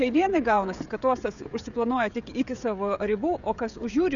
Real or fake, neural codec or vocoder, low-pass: fake; codec, 16 kHz, 16 kbps, FreqCodec, smaller model; 7.2 kHz